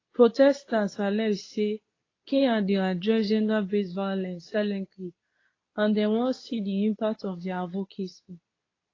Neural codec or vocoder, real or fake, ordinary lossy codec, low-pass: codec, 24 kHz, 0.9 kbps, WavTokenizer, medium speech release version 2; fake; AAC, 32 kbps; 7.2 kHz